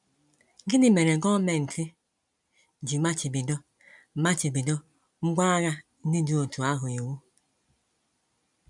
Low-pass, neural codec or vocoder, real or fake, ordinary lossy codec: 10.8 kHz; none; real; none